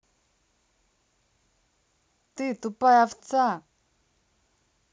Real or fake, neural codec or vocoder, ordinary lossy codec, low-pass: real; none; none; none